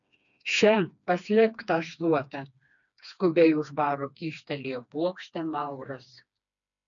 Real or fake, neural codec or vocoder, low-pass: fake; codec, 16 kHz, 2 kbps, FreqCodec, smaller model; 7.2 kHz